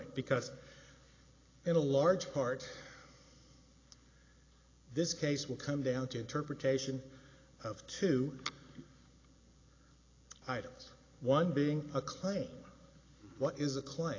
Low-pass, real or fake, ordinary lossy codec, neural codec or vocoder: 7.2 kHz; real; AAC, 32 kbps; none